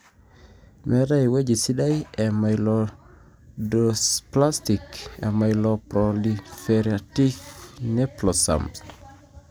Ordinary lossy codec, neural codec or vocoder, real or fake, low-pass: none; none; real; none